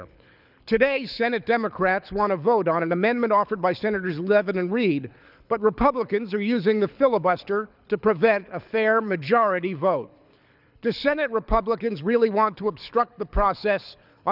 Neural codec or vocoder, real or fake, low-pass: codec, 24 kHz, 6 kbps, HILCodec; fake; 5.4 kHz